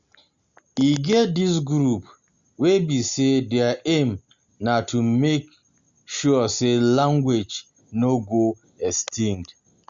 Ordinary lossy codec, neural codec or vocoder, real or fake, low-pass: Opus, 64 kbps; none; real; 7.2 kHz